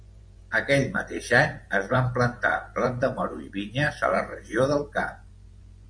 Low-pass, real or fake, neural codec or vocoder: 9.9 kHz; fake; vocoder, 24 kHz, 100 mel bands, Vocos